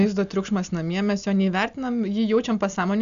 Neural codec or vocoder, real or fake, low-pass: none; real; 7.2 kHz